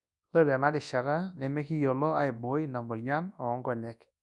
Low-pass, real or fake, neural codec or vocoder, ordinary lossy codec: 10.8 kHz; fake; codec, 24 kHz, 0.9 kbps, WavTokenizer, large speech release; none